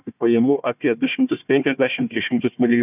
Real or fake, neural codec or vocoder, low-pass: fake; codec, 16 kHz, 1 kbps, FunCodec, trained on Chinese and English, 50 frames a second; 3.6 kHz